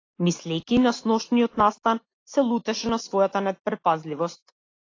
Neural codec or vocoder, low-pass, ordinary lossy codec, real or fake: none; 7.2 kHz; AAC, 32 kbps; real